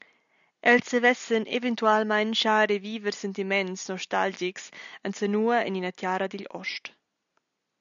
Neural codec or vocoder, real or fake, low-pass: none; real; 7.2 kHz